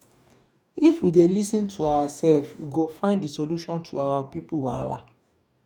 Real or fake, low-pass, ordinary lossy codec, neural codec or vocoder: fake; 19.8 kHz; none; codec, 44.1 kHz, 2.6 kbps, DAC